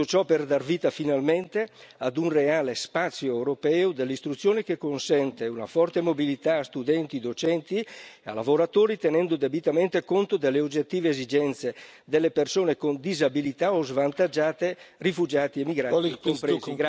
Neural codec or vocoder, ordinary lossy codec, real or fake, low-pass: none; none; real; none